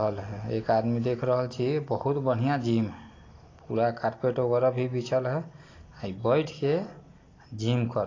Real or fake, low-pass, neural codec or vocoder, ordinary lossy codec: real; 7.2 kHz; none; AAC, 32 kbps